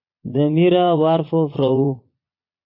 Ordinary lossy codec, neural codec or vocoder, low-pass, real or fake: AAC, 32 kbps; vocoder, 22.05 kHz, 80 mel bands, Vocos; 5.4 kHz; fake